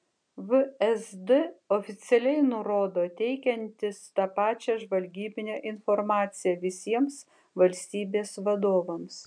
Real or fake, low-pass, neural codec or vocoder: real; 9.9 kHz; none